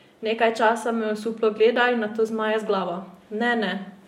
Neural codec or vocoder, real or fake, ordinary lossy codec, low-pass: none; real; MP3, 64 kbps; 19.8 kHz